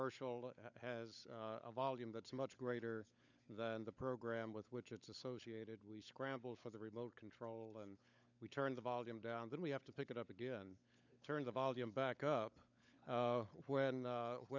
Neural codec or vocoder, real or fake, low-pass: codec, 16 kHz, 16 kbps, FunCodec, trained on LibriTTS, 50 frames a second; fake; 7.2 kHz